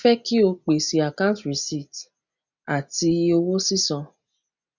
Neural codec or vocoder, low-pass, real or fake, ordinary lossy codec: none; 7.2 kHz; real; none